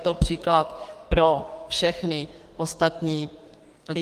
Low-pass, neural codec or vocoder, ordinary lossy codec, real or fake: 14.4 kHz; codec, 44.1 kHz, 2.6 kbps, SNAC; Opus, 32 kbps; fake